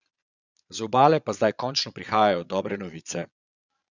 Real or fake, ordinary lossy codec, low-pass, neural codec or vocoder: fake; none; 7.2 kHz; vocoder, 22.05 kHz, 80 mel bands, Vocos